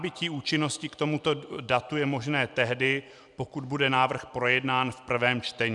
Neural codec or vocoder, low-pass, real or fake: none; 10.8 kHz; real